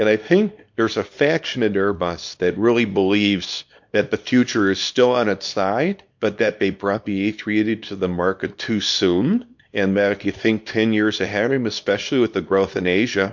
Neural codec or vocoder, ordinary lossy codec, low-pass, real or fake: codec, 24 kHz, 0.9 kbps, WavTokenizer, small release; MP3, 48 kbps; 7.2 kHz; fake